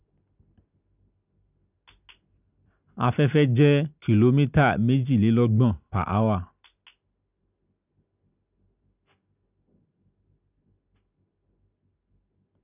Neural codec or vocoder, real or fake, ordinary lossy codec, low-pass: none; real; none; 3.6 kHz